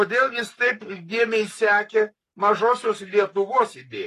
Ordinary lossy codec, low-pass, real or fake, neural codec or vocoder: AAC, 48 kbps; 14.4 kHz; fake; codec, 44.1 kHz, 7.8 kbps, Pupu-Codec